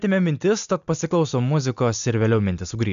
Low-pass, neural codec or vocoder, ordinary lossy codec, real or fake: 7.2 kHz; none; AAC, 96 kbps; real